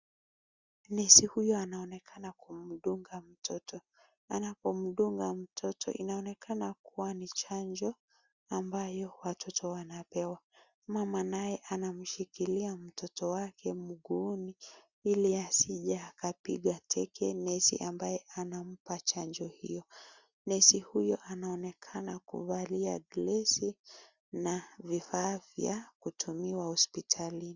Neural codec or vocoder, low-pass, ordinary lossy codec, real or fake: none; 7.2 kHz; Opus, 64 kbps; real